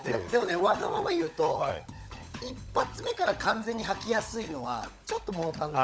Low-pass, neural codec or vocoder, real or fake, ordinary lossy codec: none; codec, 16 kHz, 16 kbps, FunCodec, trained on LibriTTS, 50 frames a second; fake; none